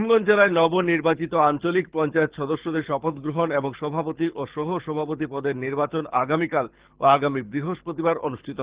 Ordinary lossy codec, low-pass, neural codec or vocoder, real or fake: Opus, 16 kbps; 3.6 kHz; codec, 24 kHz, 6 kbps, HILCodec; fake